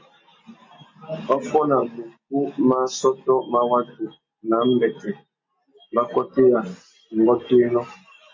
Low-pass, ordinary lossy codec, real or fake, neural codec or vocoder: 7.2 kHz; MP3, 32 kbps; real; none